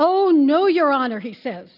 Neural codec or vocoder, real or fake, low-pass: none; real; 5.4 kHz